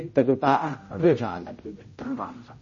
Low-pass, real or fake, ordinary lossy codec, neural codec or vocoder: 7.2 kHz; fake; MP3, 32 kbps; codec, 16 kHz, 0.5 kbps, X-Codec, HuBERT features, trained on general audio